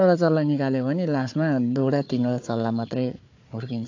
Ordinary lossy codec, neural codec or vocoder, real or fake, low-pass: AAC, 48 kbps; codec, 16 kHz, 4 kbps, FunCodec, trained on Chinese and English, 50 frames a second; fake; 7.2 kHz